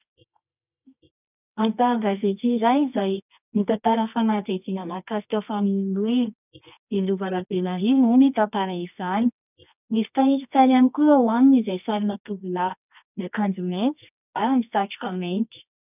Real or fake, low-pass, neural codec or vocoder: fake; 3.6 kHz; codec, 24 kHz, 0.9 kbps, WavTokenizer, medium music audio release